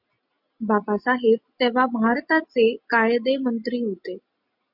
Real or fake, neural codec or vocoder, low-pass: real; none; 5.4 kHz